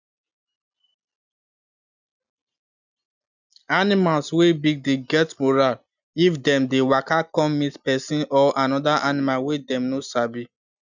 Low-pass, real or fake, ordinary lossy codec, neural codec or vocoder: 7.2 kHz; real; none; none